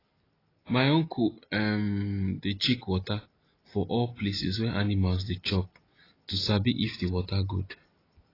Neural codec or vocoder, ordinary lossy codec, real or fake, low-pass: none; AAC, 24 kbps; real; 5.4 kHz